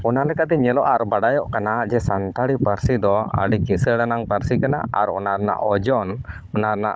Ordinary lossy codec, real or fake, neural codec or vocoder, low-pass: none; fake; codec, 16 kHz, 16 kbps, FunCodec, trained on Chinese and English, 50 frames a second; none